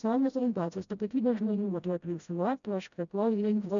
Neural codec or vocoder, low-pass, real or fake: codec, 16 kHz, 0.5 kbps, FreqCodec, smaller model; 7.2 kHz; fake